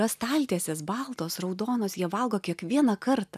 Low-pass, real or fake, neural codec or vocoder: 14.4 kHz; real; none